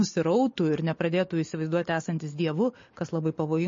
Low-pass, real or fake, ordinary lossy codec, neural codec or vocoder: 7.2 kHz; real; MP3, 32 kbps; none